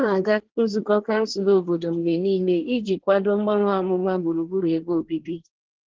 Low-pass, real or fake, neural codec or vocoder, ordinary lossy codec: 7.2 kHz; fake; codec, 24 kHz, 1 kbps, SNAC; Opus, 16 kbps